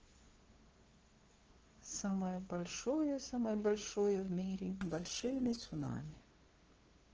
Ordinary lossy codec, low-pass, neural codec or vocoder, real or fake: Opus, 16 kbps; 7.2 kHz; codec, 16 kHz, 2 kbps, FunCodec, trained on LibriTTS, 25 frames a second; fake